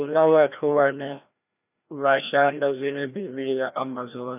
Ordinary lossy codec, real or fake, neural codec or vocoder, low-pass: none; fake; codec, 16 kHz, 1 kbps, FreqCodec, larger model; 3.6 kHz